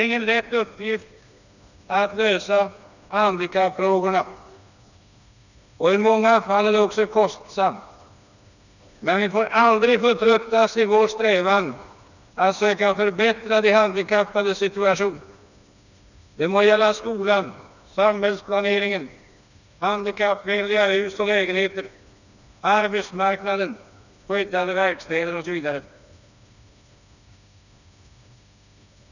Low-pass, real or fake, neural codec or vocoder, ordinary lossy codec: 7.2 kHz; fake; codec, 16 kHz, 2 kbps, FreqCodec, smaller model; none